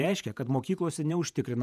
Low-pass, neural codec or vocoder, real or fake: 14.4 kHz; vocoder, 48 kHz, 128 mel bands, Vocos; fake